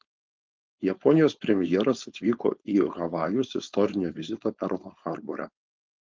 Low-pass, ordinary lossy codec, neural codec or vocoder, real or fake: 7.2 kHz; Opus, 32 kbps; codec, 16 kHz, 4.8 kbps, FACodec; fake